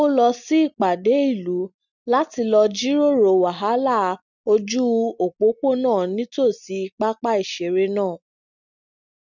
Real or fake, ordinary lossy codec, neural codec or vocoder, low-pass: real; none; none; 7.2 kHz